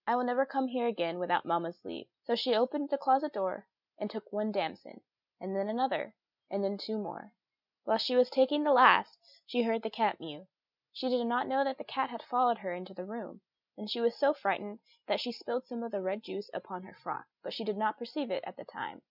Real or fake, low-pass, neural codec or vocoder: real; 5.4 kHz; none